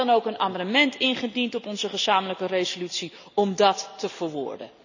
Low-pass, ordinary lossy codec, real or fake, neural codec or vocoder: 7.2 kHz; none; real; none